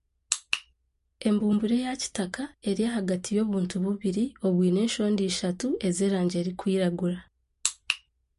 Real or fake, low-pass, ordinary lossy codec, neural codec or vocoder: real; 14.4 kHz; MP3, 48 kbps; none